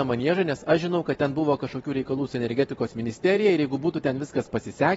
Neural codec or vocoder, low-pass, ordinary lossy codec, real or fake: none; 19.8 kHz; AAC, 24 kbps; real